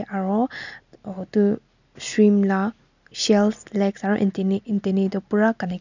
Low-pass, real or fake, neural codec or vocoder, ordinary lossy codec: 7.2 kHz; real; none; none